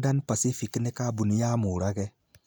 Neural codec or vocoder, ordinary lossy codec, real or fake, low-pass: none; none; real; none